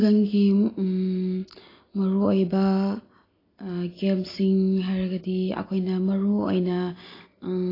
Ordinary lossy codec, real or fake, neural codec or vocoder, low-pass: AAC, 24 kbps; real; none; 5.4 kHz